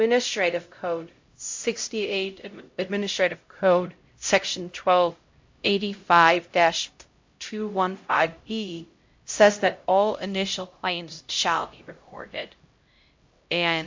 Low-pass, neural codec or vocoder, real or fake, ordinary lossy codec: 7.2 kHz; codec, 16 kHz, 0.5 kbps, X-Codec, HuBERT features, trained on LibriSpeech; fake; MP3, 48 kbps